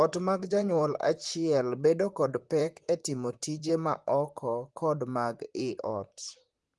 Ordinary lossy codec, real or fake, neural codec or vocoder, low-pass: Opus, 24 kbps; fake; vocoder, 44.1 kHz, 128 mel bands, Pupu-Vocoder; 10.8 kHz